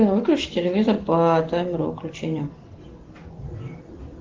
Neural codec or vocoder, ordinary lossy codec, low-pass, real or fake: none; Opus, 16 kbps; 7.2 kHz; real